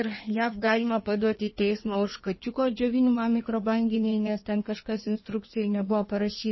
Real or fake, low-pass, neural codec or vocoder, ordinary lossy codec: fake; 7.2 kHz; codec, 16 kHz in and 24 kHz out, 1.1 kbps, FireRedTTS-2 codec; MP3, 24 kbps